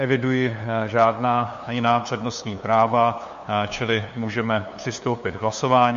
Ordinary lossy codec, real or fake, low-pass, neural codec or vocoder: MP3, 48 kbps; fake; 7.2 kHz; codec, 16 kHz, 2 kbps, FunCodec, trained on LibriTTS, 25 frames a second